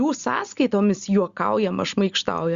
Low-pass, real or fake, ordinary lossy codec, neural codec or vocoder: 7.2 kHz; real; Opus, 64 kbps; none